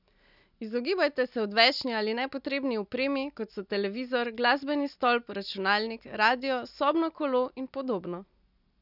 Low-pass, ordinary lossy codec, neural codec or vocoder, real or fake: 5.4 kHz; none; none; real